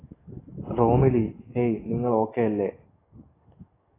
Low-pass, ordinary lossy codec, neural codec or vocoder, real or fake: 3.6 kHz; AAC, 16 kbps; codec, 44.1 kHz, 7.8 kbps, DAC; fake